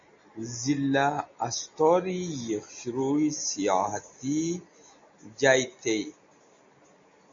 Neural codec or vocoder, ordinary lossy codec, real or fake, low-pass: none; MP3, 48 kbps; real; 7.2 kHz